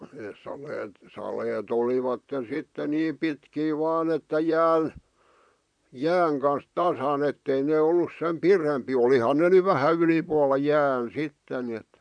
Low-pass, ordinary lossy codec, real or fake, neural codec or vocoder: 9.9 kHz; none; fake; vocoder, 44.1 kHz, 128 mel bands, Pupu-Vocoder